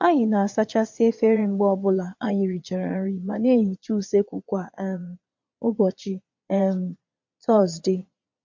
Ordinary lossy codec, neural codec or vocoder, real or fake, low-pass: MP3, 48 kbps; vocoder, 22.05 kHz, 80 mel bands, Vocos; fake; 7.2 kHz